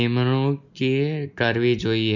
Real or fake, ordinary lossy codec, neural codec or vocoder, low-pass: real; none; none; 7.2 kHz